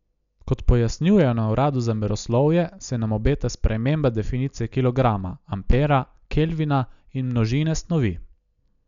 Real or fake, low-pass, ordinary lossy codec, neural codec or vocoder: real; 7.2 kHz; none; none